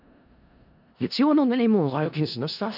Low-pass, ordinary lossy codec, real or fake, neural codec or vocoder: 5.4 kHz; none; fake; codec, 16 kHz in and 24 kHz out, 0.4 kbps, LongCat-Audio-Codec, four codebook decoder